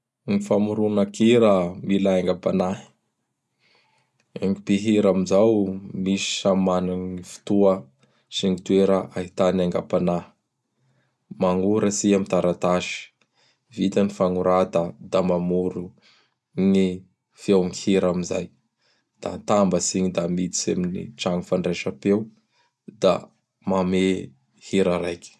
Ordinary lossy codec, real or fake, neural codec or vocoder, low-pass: none; real; none; none